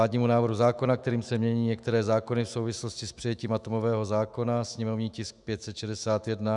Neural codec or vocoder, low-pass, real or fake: autoencoder, 48 kHz, 128 numbers a frame, DAC-VAE, trained on Japanese speech; 10.8 kHz; fake